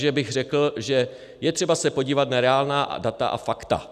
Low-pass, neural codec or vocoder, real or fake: 14.4 kHz; none; real